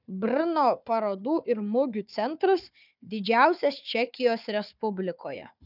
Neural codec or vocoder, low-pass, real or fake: codec, 16 kHz, 4 kbps, FunCodec, trained on Chinese and English, 50 frames a second; 5.4 kHz; fake